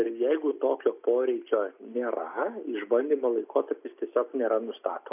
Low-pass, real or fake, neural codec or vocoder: 3.6 kHz; real; none